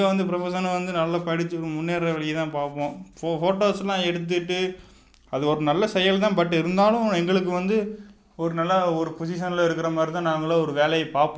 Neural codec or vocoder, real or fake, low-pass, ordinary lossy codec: none; real; none; none